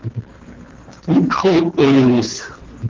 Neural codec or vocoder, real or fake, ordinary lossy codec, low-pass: codec, 24 kHz, 3 kbps, HILCodec; fake; Opus, 24 kbps; 7.2 kHz